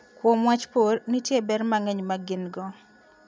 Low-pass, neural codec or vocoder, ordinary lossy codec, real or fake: none; none; none; real